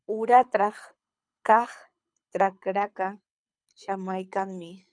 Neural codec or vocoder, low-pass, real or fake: codec, 24 kHz, 6 kbps, HILCodec; 9.9 kHz; fake